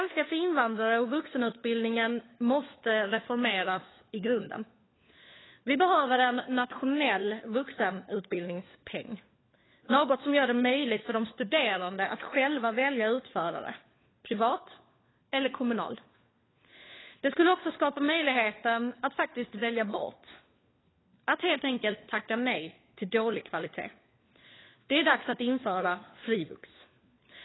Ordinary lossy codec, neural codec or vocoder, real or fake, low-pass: AAC, 16 kbps; codec, 16 kHz, 4 kbps, FunCodec, trained on LibriTTS, 50 frames a second; fake; 7.2 kHz